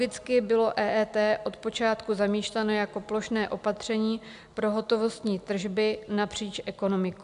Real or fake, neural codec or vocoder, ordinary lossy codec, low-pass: real; none; AAC, 96 kbps; 10.8 kHz